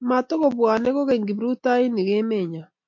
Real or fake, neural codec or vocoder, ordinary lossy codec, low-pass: real; none; MP3, 48 kbps; 7.2 kHz